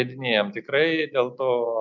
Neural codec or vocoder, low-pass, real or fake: none; 7.2 kHz; real